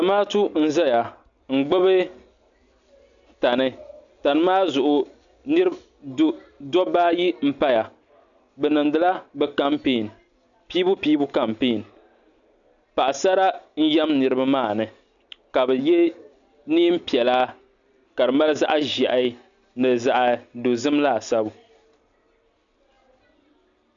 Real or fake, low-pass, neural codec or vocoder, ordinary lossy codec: real; 7.2 kHz; none; MP3, 96 kbps